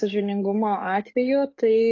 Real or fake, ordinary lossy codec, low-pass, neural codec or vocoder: fake; AAC, 32 kbps; 7.2 kHz; codec, 44.1 kHz, 7.8 kbps, DAC